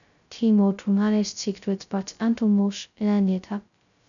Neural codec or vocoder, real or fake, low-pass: codec, 16 kHz, 0.2 kbps, FocalCodec; fake; 7.2 kHz